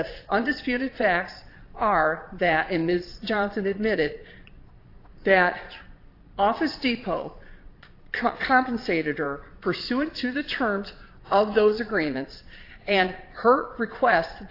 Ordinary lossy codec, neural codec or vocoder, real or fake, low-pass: AAC, 32 kbps; vocoder, 22.05 kHz, 80 mel bands, Vocos; fake; 5.4 kHz